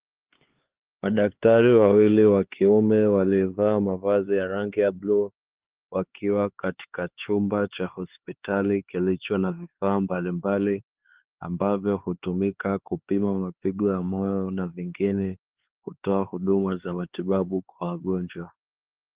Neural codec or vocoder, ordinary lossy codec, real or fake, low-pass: codec, 16 kHz, 4 kbps, X-Codec, HuBERT features, trained on LibriSpeech; Opus, 16 kbps; fake; 3.6 kHz